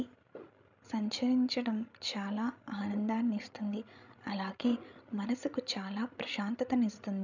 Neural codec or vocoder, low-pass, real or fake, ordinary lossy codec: codec, 16 kHz, 16 kbps, FreqCodec, larger model; 7.2 kHz; fake; none